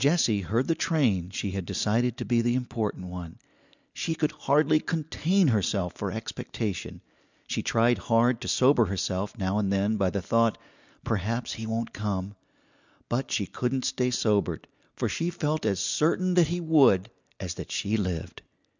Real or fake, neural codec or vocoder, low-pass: real; none; 7.2 kHz